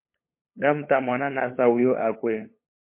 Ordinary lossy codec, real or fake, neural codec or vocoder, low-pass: MP3, 24 kbps; fake; codec, 16 kHz, 8 kbps, FunCodec, trained on LibriTTS, 25 frames a second; 3.6 kHz